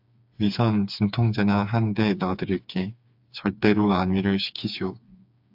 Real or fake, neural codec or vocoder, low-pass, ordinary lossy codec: fake; codec, 16 kHz, 4 kbps, FreqCodec, smaller model; 5.4 kHz; AAC, 48 kbps